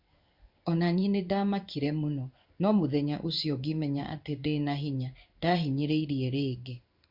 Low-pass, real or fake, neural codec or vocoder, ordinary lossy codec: 5.4 kHz; fake; codec, 16 kHz in and 24 kHz out, 1 kbps, XY-Tokenizer; Opus, 64 kbps